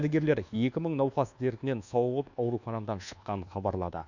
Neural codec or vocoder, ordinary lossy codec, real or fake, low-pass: codec, 24 kHz, 1.2 kbps, DualCodec; none; fake; 7.2 kHz